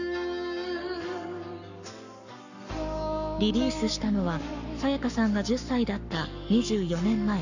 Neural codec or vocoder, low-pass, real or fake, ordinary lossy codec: codec, 44.1 kHz, 7.8 kbps, Pupu-Codec; 7.2 kHz; fake; none